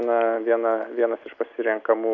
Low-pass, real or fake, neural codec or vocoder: 7.2 kHz; real; none